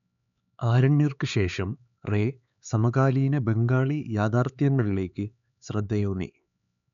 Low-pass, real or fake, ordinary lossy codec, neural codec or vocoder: 7.2 kHz; fake; none; codec, 16 kHz, 4 kbps, X-Codec, HuBERT features, trained on LibriSpeech